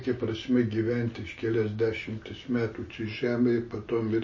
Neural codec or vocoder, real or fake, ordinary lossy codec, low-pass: none; real; MP3, 32 kbps; 7.2 kHz